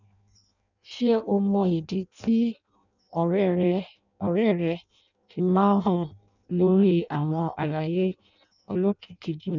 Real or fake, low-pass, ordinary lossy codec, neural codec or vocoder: fake; 7.2 kHz; none; codec, 16 kHz in and 24 kHz out, 0.6 kbps, FireRedTTS-2 codec